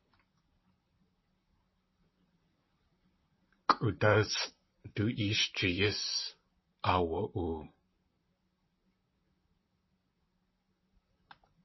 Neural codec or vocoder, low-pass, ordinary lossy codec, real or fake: vocoder, 44.1 kHz, 128 mel bands, Pupu-Vocoder; 7.2 kHz; MP3, 24 kbps; fake